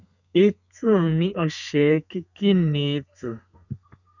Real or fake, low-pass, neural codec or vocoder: fake; 7.2 kHz; codec, 32 kHz, 1.9 kbps, SNAC